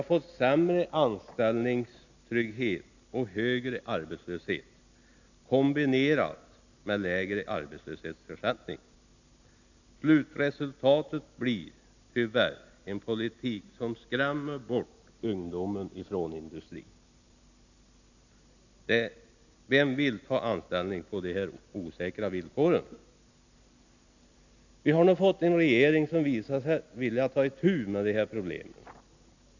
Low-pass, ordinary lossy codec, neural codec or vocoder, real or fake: 7.2 kHz; none; none; real